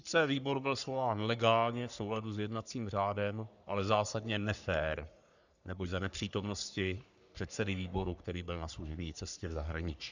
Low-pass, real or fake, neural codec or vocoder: 7.2 kHz; fake; codec, 44.1 kHz, 3.4 kbps, Pupu-Codec